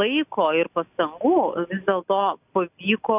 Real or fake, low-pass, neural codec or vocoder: real; 3.6 kHz; none